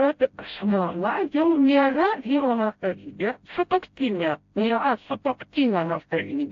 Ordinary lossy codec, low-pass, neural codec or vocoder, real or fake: AAC, 48 kbps; 7.2 kHz; codec, 16 kHz, 0.5 kbps, FreqCodec, smaller model; fake